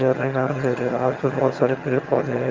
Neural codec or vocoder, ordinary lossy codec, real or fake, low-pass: vocoder, 22.05 kHz, 80 mel bands, HiFi-GAN; Opus, 32 kbps; fake; 7.2 kHz